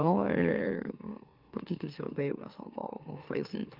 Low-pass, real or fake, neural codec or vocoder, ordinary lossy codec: 5.4 kHz; fake; autoencoder, 44.1 kHz, a latent of 192 numbers a frame, MeloTTS; Opus, 32 kbps